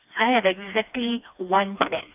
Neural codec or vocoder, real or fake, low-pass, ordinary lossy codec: codec, 16 kHz, 2 kbps, FreqCodec, smaller model; fake; 3.6 kHz; none